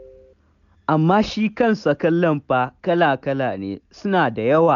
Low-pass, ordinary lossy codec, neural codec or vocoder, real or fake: 7.2 kHz; none; none; real